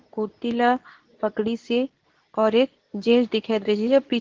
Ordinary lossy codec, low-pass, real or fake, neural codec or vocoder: Opus, 16 kbps; 7.2 kHz; fake; codec, 24 kHz, 0.9 kbps, WavTokenizer, medium speech release version 2